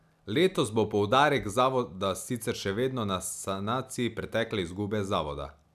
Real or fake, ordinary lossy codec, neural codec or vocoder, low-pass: real; none; none; 14.4 kHz